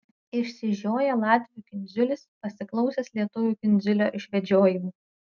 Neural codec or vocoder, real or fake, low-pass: none; real; 7.2 kHz